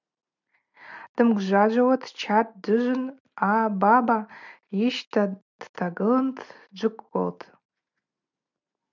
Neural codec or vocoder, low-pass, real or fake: none; 7.2 kHz; real